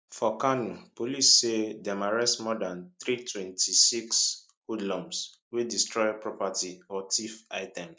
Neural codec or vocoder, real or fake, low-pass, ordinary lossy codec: none; real; none; none